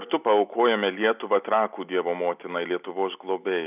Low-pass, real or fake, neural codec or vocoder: 3.6 kHz; real; none